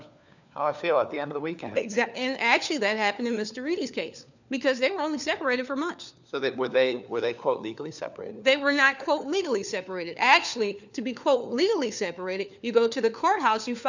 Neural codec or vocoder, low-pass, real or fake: codec, 16 kHz, 4 kbps, FunCodec, trained on LibriTTS, 50 frames a second; 7.2 kHz; fake